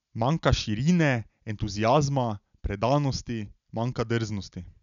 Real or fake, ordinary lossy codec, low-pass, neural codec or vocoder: real; none; 7.2 kHz; none